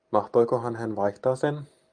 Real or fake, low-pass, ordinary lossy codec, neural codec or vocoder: real; 9.9 kHz; Opus, 32 kbps; none